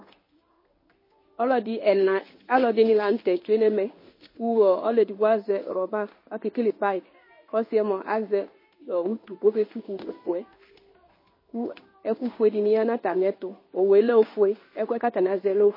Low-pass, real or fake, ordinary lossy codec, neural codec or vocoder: 5.4 kHz; fake; MP3, 24 kbps; codec, 16 kHz in and 24 kHz out, 1 kbps, XY-Tokenizer